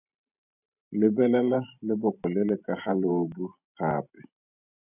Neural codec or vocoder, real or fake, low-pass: vocoder, 44.1 kHz, 128 mel bands every 512 samples, BigVGAN v2; fake; 3.6 kHz